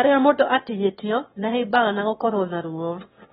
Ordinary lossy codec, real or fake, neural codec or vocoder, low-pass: AAC, 16 kbps; fake; autoencoder, 22.05 kHz, a latent of 192 numbers a frame, VITS, trained on one speaker; 9.9 kHz